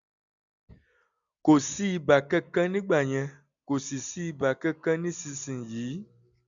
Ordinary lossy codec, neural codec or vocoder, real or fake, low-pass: none; none; real; 7.2 kHz